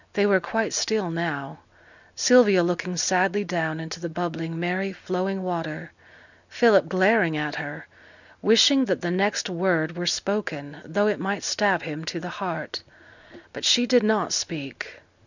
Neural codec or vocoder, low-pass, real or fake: none; 7.2 kHz; real